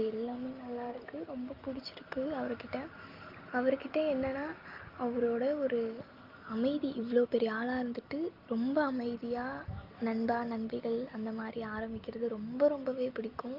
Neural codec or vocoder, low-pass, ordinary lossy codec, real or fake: none; 5.4 kHz; Opus, 32 kbps; real